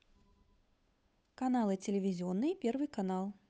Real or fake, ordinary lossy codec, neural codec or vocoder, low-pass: fake; none; codec, 16 kHz, 8 kbps, FunCodec, trained on Chinese and English, 25 frames a second; none